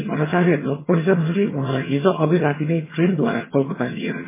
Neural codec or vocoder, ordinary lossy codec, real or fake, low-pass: vocoder, 22.05 kHz, 80 mel bands, HiFi-GAN; MP3, 16 kbps; fake; 3.6 kHz